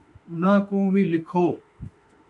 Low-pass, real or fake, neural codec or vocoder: 10.8 kHz; fake; autoencoder, 48 kHz, 32 numbers a frame, DAC-VAE, trained on Japanese speech